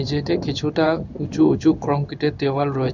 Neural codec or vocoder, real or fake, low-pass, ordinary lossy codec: codec, 16 kHz in and 24 kHz out, 1 kbps, XY-Tokenizer; fake; 7.2 kHz; none